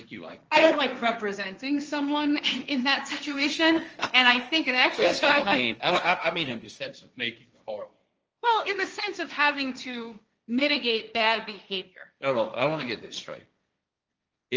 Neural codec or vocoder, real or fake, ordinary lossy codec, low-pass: codec, 16 kHz, 1.1 kbps, Voila-Tokenizer; fake; Opus, 24 kbps; 7.2 kHz